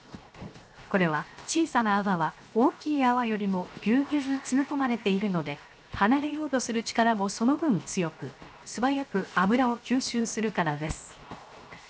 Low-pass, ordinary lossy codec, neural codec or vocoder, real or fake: none; none; codec, 16 kHz, 0.7 kbps, FocalCodec; fake